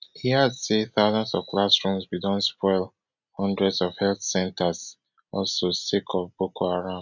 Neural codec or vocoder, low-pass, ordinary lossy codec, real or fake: none; 7.2 kHz; none; real